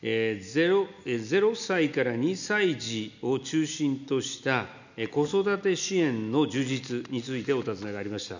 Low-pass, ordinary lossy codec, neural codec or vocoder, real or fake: 7.2 kHz; none; none; real